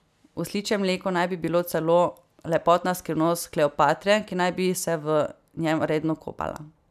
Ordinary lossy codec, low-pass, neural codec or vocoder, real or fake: none; 14.4 kHz; none; real